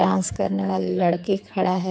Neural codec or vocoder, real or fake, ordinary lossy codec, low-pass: codec, 16 kHz, 4 kbps, X-Codec, HuBERT features, trained on general audio; fake; none; none